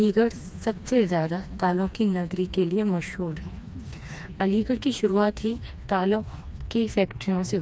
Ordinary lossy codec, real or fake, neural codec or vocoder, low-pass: none; fake; codec, 16 kHz, 2 kbps, FreqCodec, smaller model; none